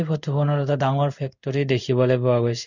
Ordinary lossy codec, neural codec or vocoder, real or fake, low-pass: none; codec, 16 kHz in and 24 kHz out, 1 kbps, XY-Tokenizer; fake; 7.2 kHz